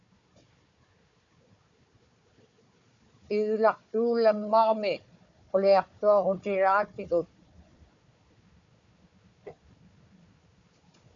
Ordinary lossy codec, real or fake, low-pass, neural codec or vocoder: MP3, 64 kbps; fake; 7.2 kHz; codec, 16 kHz, 4 kbps, FunCodec, trained on Chinese and English, 50 frames a second